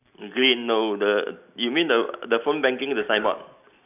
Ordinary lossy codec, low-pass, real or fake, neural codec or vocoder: none; 3.6 kHz; real; none